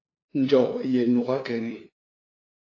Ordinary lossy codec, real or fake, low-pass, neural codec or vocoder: AAC, 32 kbps; fake; 7.2 kHz; codec, 16 kHz, 2 kbps, FunCodec, trained on LibriTTS, 25 frames a second